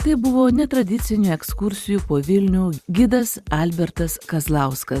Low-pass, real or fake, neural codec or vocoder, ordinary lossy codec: 14.4 kHz; fake; vocoder, 44.1 kHz, 128 mel bands every 256 samples, BigVGAN v2; Opus, 64 kbps